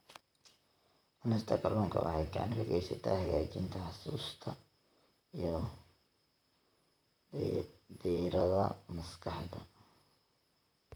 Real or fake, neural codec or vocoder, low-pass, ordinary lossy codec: fake; vocoder, 44.1 kHz, 128 mel bands, Pupu-Vocoder; none; none